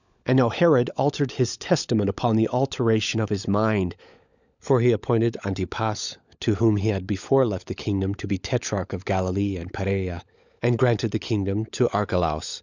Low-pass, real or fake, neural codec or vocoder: 7.2 kHz; fake; codec, 16 kHz, 16 kbps, FunCodec, trained on LibriTTS, 50 frames a second